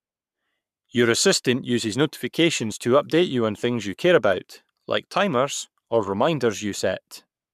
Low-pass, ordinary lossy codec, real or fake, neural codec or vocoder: 14.4 kHz; none; fake; codec, 44.1 kHz, 7.8 kbps, Pupu-Codec